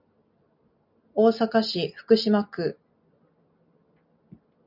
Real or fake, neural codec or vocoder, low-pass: real; none; 5.4 kHz